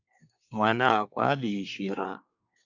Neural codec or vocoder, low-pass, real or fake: codec, 24 kHz, 1 kbps, SNAC; 7.2 kHz; fake